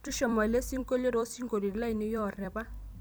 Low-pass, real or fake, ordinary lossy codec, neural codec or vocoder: none; fake; none; vocoder, 44.1 kHz, 128 mel bands every 256 samples, BigVGAN v2